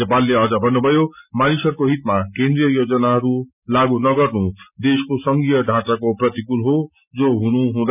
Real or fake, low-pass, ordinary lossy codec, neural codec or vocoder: real; 3.6 kHz; none; none